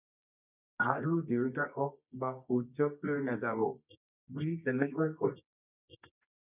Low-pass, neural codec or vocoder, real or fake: 3.6 kHz; codec, 24 kHz, 0.9 kbps, WavTokenizer, medium music audio release; fake